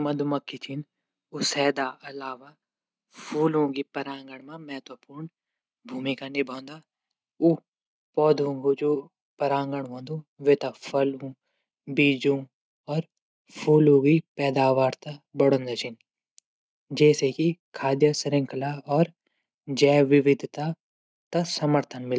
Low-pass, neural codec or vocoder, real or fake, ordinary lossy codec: none; none; real; none